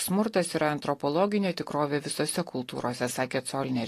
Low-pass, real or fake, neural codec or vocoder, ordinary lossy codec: 14.4 kHz; real; none; AAC, 48 kbps